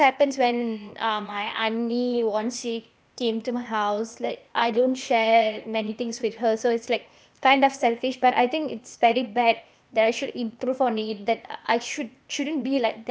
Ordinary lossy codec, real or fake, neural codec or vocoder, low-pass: none; fake; codec, 16 kHz, 0.8 kbps, ZipCodec; none